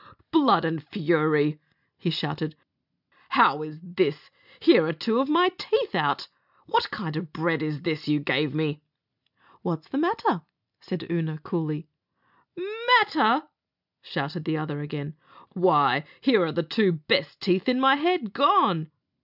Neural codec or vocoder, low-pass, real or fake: none; 5.4 kHz; real